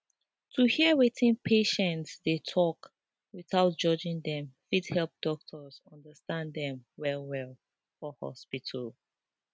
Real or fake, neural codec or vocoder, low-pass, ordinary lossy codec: real; none; none; none